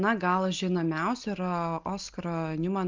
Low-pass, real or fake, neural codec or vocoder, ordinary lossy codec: 7.2 kHz; real; none; Opus, 32 kbps